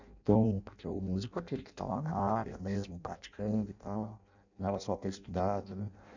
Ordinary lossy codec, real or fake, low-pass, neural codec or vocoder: none; fake; 7.2 kHz; codec, 16 kHz in and 24 kHz out, 0.6 kbps, FireRedTTS-2 codec